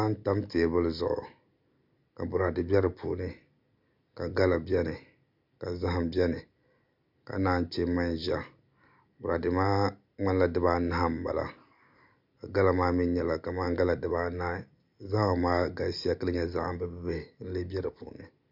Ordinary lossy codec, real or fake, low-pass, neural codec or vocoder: MP3, 48 kbps; real; 5.4 kHz; none